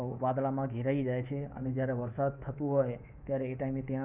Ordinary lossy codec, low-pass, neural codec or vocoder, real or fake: none; 3.6 kHz; codec, 24 kHz, 3.1 kbps, DualCodec; fake